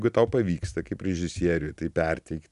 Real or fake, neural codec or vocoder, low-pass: real; none; 10.8 kHz